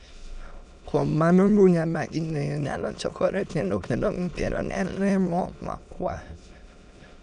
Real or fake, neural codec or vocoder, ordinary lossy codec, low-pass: fake; autoencoder, 22.05 kHz, a latent of 192 numbers a frame, VITS, trained on many speakers; none; 9.9 kHz